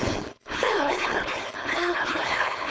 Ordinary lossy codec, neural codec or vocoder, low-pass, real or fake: none; codec, 16 kHz, 4.8 kbps, FACodec; none; fake